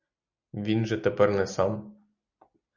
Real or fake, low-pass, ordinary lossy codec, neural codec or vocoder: real; 7.2 kHz; Opus, 64 kbps; none